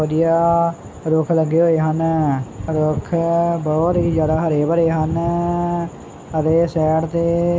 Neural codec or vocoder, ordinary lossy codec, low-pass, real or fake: none; none; none; real